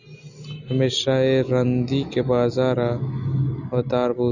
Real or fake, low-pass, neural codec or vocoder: real; 7.2 kHz; none